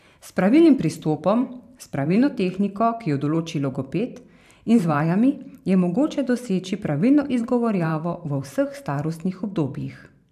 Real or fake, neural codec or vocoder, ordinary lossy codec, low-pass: fake; vocoder, 44.1 kHz, 128 mel bands every 512 samples, BigVGAN v2; AAC, 96 kbps; 14.4 kHz